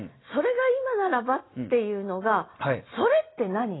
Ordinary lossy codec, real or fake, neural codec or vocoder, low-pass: AAC, 16 kbps; real; none; 7.2 kHz